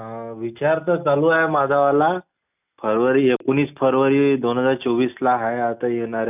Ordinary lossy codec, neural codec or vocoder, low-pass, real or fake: none; none; 3.6 kHz; real